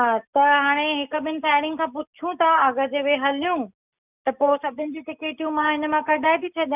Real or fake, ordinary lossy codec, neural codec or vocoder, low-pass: real; none; none; 3.6 kHz